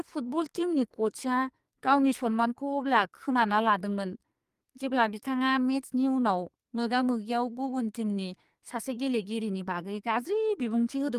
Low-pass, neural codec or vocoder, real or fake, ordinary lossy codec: 14.4 kHz; codec, 44.1 kHz, 2.6 kbps, SNAC; fake; Opus, 32 kbps